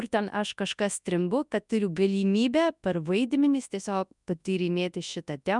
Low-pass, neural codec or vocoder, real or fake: 10.8 kHz; codec, 24 kHz, 0.9 kbps, WavTokenizer, large speech release; fake